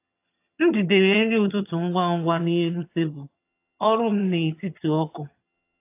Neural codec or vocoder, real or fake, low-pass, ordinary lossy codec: vocoder, 22.05 kHz, 80 mel bands, HiFi-GAN; fake; 3.6 kHz; AAC, 24 kbps